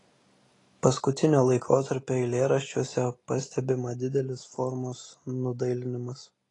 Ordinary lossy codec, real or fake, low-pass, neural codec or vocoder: AAC, 32 kbps; real; 10.8 kHz; none